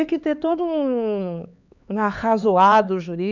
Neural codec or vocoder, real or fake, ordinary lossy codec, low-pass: codec, 16 kHz, 4 kbps, X-Codec, HuBERT features, trained on LibriSpeech; fake; none; 7.2 kHz